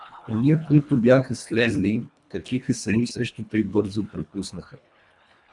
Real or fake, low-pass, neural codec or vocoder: fake; 10.8 kHz; codec, 24 kHz, 1.5 kbps, HILCodec